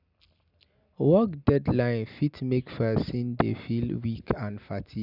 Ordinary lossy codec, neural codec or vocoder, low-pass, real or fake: none; none; 5.4 kHz; real